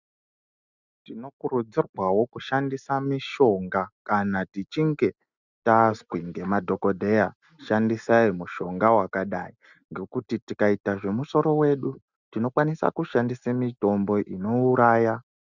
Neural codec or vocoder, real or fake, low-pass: none; real; 7.2 kHz